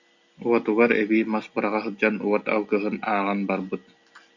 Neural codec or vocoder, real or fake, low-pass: none; real; 7.2 kHz